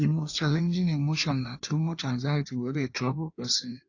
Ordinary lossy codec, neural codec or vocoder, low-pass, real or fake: AAC, 48 kbps; codec, 16 kHz in and 24 kHz out, 1.1 kbps, FireRedTTS-2 codec; 7.2 kHz; fake